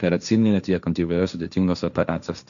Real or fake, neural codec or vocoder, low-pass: fake; codec, 16 kHz, 1.1 kbps, Voila-Tokenizer; 7.2 kHz